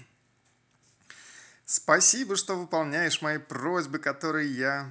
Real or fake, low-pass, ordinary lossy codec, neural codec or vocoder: real; none; none; none